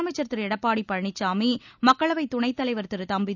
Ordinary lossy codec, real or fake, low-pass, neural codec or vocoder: none; real; 7.2 kHz; none